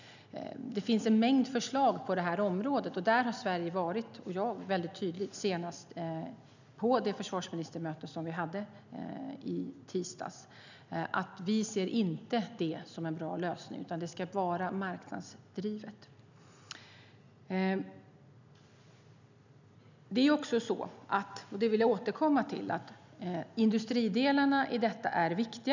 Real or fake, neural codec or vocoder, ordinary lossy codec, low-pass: real; none; none; 7.2 kHz